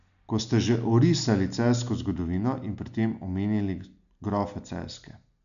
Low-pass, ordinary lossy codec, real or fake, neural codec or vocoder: 7.2 kHz; none; real; none